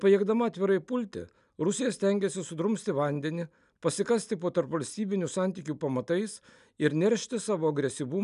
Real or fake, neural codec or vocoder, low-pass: real; none; 10.8 kHz